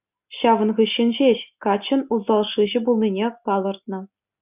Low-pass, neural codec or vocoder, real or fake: 3.6 kHz; none; real